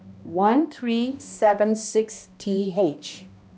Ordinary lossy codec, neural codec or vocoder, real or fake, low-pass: none; codec, 16 kHz, 1 kbps, X-Codec, HuBERT features, trained on balanced general audio; fake; none